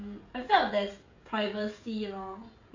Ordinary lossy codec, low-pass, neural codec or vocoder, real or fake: AAC, 48 kbps; 7.2 kHz; codec, 16 kHz, 16 kbps, FreqCodec, smaller model; fake